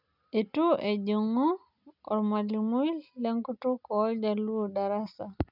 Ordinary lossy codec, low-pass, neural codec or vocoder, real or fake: none; 5.4 kHz; none; real